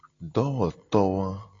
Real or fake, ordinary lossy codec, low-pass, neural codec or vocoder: real; AAC, 32 kbps; 7.2 kHz; none